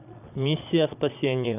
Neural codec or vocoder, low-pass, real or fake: vocoder, 22.05 kHz, 80 mel bands, Vocos; 3.6 kHz; fake